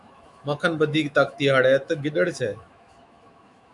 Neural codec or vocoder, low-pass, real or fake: autoencoder, 48 kHz, 128 numbers a frame, DAC-VAE, trained on Japanese speech; 10.8 kHz; fake